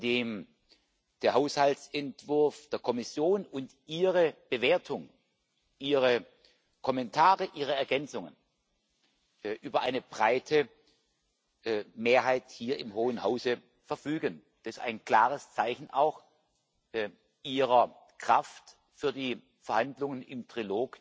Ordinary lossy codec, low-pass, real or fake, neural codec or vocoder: none; none; real; none